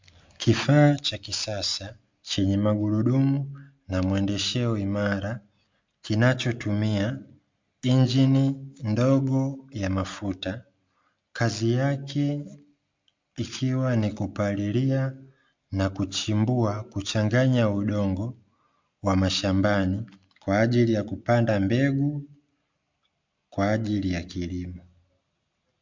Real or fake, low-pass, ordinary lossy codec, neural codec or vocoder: real; 7.2 kHz; MP3, 64 kbps; none